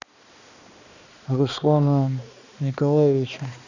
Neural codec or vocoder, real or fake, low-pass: codec, 16 kHz, 2 kbps, X-Codec, HuBERT features, trained on balanced general audio; fake; 7.2 kHz